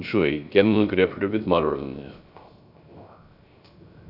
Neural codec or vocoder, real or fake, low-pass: codec, 16 kHz, 0.3 kbps, FocalCodec; fake; 5.4 kHz